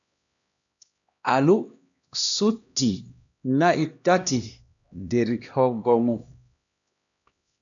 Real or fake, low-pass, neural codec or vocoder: fake; 7.2 kHz; codec, 16 kHz, 1 kbps, X-Codec, HuBERT features, trained on LibriSpeech